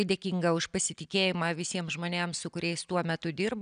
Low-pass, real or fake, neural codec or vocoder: 9.9 kHz; real; none